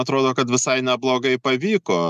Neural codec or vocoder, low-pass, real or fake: none; 14.4 kHz; real